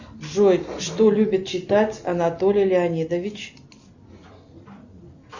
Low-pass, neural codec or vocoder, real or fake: 7.2 kHz; none; real